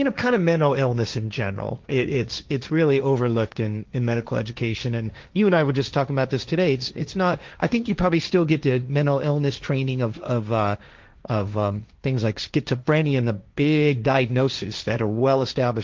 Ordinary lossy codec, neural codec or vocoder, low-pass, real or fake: Opus, 32 kbps; codec, 16 kHz, 1.1 kbps, Voila-Tokenizer; 7.2 kHz; fake